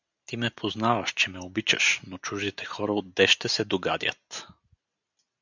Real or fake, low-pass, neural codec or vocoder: real; 7.2 kHz; none